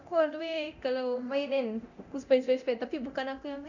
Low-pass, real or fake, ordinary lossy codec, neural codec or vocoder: 7.2 kHz; fake; none; codec, 24 kHz, 0.9 kbps, DualCodec